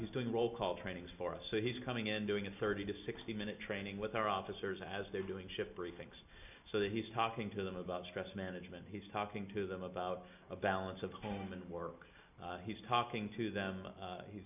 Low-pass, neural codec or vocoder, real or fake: 3.6 kHz; none; real